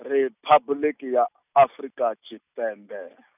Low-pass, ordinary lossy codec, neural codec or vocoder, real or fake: 3.6 kHz; none; none; real